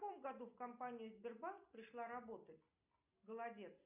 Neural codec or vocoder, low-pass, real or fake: none; 3.6 kHz; real